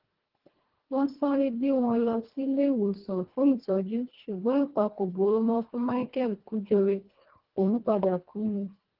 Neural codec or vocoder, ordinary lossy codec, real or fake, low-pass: codec, 24 kHz, 1.5 kbps, HILCodec; Opus, 16 kbps; fake; 5.4 kHz